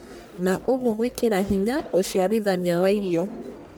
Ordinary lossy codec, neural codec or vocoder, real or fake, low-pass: none; codec, 44.1 kHz, 1.7 kbps, Pupu-Codec; fake; none